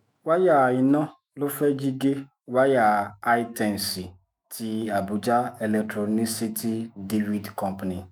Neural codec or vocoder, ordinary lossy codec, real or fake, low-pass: autoencoder, 48 kHz, 128 numbers a frame, DAC-VAE, trained on Japanese speech; none; fake; none